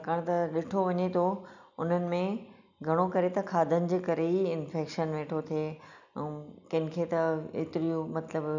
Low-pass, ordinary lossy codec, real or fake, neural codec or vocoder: 7.2 kHz; none; real; none